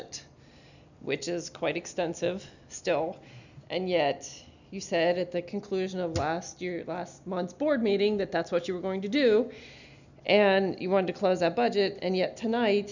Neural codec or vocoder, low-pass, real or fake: none; 7.2 kHz; real